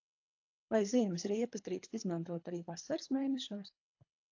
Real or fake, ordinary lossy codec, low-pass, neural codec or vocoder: fake; AAC, 48 kbps; 7.2 kHz; codec, 24 kHz, 3 kbps, HILCodec